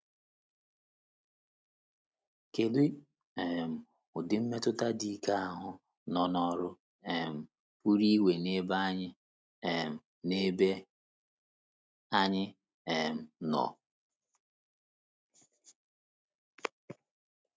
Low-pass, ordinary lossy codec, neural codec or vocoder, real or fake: none; none; none; real